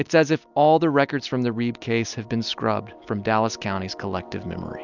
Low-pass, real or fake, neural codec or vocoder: 7.2 kHz; real; none